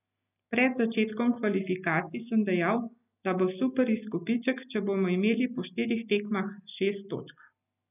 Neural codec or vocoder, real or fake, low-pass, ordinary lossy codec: none; real; 3.6 kHz; none